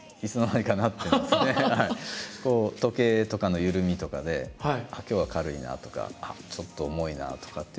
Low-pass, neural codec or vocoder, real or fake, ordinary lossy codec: none; none; real; none